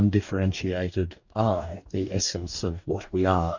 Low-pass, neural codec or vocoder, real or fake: 7.2 kHz; codec, 44.1 kHz, 2.6 kbps, DAC; fake